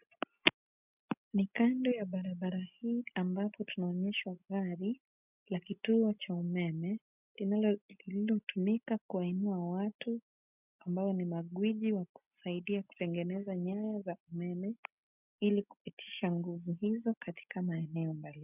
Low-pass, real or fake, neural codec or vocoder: 3.6 kHz; real; none